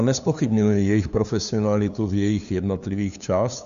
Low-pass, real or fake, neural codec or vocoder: 7.2 kHz; fake; codec, 16 kHz, 2 kbps, FunCodec, trained on LibriTTS, 25 frames a second